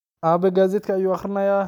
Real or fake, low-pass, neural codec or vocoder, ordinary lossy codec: real; 19.8 kHz; none; none